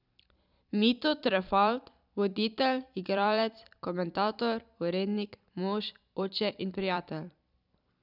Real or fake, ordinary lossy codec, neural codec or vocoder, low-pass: fake; none; vocoder, 44.1 kHz, 128 mel bands every 256 samples, BigVGAN v2; 5.4 kHz